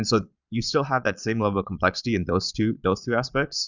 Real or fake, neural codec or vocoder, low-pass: fake; vocoder, 22.05 kHz, 80 mel bands, Vocos; 7.2 kHz